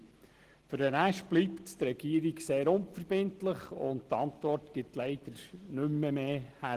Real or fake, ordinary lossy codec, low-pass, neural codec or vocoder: real; Opus, 16 kbps; 14.4 kHz; none